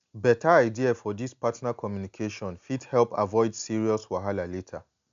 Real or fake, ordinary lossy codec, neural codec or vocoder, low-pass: real; MP3, 64 kbps; none; 7.2 kHz